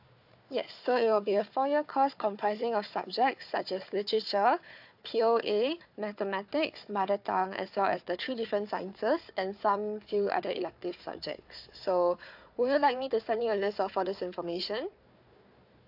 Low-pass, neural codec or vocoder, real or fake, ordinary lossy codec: 5.4 kHz; codec, 16 kHz, 4 kbps, FunCodec, trained on Chinese and English, 50 frames a second; fake; AAC, 48 kbps